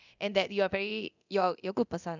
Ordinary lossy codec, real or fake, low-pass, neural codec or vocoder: none; fake; 7.2 kHz; codec, 24 kHz, 0.9 kbps, DualCodec